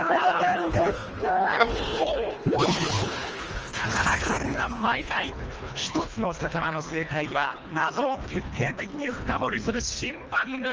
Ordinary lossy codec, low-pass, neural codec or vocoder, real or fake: Opus, 24 kbps; 7.2 kHz; codec, 24 kHz, 1.5 kbps, HILCodec; fake